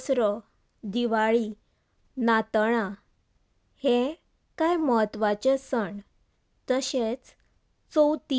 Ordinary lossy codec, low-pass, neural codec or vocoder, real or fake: none; none; none; real